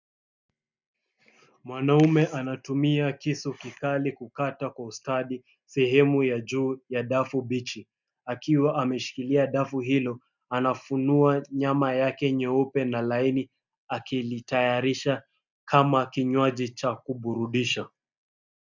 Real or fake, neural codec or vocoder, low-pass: real; none; 7.2 kHz